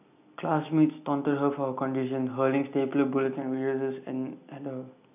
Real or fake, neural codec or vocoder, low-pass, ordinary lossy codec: real; none; 3.6 kHz; none